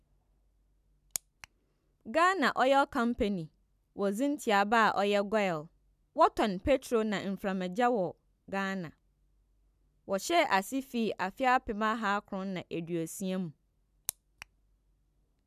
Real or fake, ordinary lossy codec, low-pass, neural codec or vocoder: real; none; 14.4 kHz; none